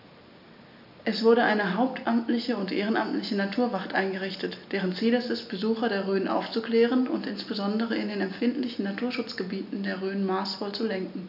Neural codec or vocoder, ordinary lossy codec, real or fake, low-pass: none; none; real; 5.4 kHz